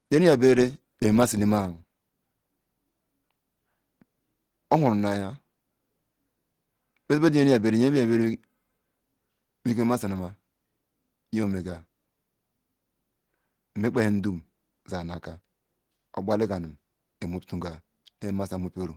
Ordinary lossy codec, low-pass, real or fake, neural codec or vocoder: Opus, 16 kbps; 19.8 kHz; real; none